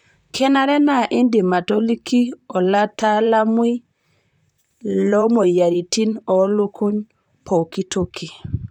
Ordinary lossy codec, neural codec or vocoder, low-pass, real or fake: none; vocoder, 44.1 kHz, 128 mel bands, Pupu-Vocoder; 19.8 kHz; fake